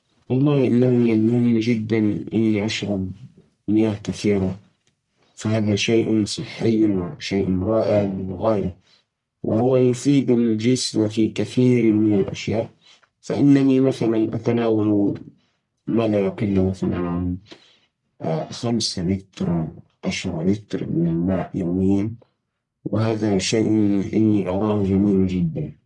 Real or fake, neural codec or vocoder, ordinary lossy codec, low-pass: fake; codec, 44.1 kHz, 1.7 kbps, Pupu-Codec; MP3, 96 kbps; 10.8 kHz